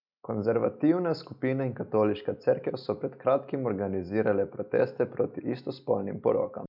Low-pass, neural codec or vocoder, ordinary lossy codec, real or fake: 5.4 kHz; none; none; real